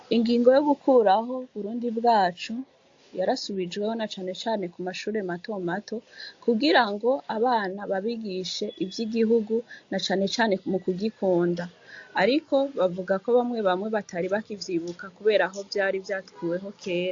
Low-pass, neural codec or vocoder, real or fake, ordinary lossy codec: 7.2 kHz; none; real; AAC, 48 kbps